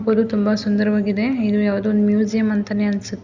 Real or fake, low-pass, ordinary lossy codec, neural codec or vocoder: real; 7.2 kHz; Opus, 64 kbps; none